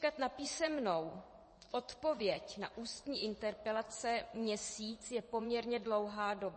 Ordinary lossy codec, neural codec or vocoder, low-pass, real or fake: MP3, 32 kbps; vocoder, 24 kHz, 100 mel bands, Vocos; 10.8 kHz; fake